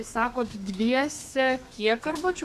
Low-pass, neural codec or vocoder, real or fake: 14.4 kHz; codec, 32 kHz, 1.9 kbps, SNAC; fake